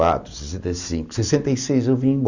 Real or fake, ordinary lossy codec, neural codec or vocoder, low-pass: real; none; none; 7.2 kHz